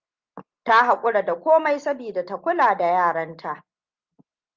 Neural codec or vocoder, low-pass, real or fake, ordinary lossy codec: none; 7.2 kHz; real; Opus, 24 kbps